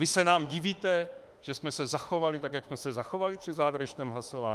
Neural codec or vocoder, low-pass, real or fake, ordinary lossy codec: autoencoder, 48 kHz, 32 numbers a frame, DAC-VAE, trained on Japanese speech; 14.4 kHz; fake; Opus, 32 kbps